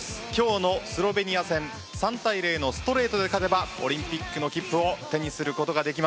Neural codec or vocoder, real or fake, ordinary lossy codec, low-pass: none; real; none; none